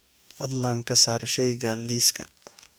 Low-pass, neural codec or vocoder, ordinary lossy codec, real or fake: none; codec, 44.1 kHz, 2.6 kbps, DAC; none; fake